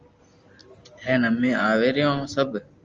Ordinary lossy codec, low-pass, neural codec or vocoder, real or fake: Opus, 32 kbps; 7.2 kHz; none; real